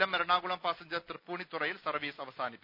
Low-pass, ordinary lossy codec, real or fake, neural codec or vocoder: 5.4 kHz; none; real; none